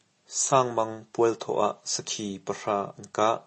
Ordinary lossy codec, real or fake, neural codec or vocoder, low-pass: MP3, 32 kbps; real; none; 10.8 kHz